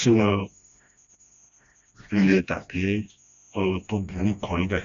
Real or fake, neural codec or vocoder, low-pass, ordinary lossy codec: fake; codec, 16 kHz, 1 kbps, FreqCodec, smaller model; 7.2 kHz; AAC, 64 kbps